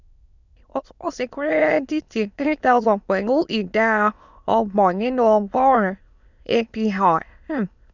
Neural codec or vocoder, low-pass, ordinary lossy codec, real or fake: autoencoder, 22.05 kHz, a latent of 192 numbers a frame, VITS, trained on many speakers; 7.2 kHz; none; fake